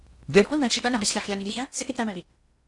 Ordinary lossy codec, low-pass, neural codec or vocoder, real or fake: AAC, 64 kbps; 10.8 kHz; codec, 16 kHz in and 24 kHz out, 0.8 kbps, FocalCodec, streaming, 65536 codes; fake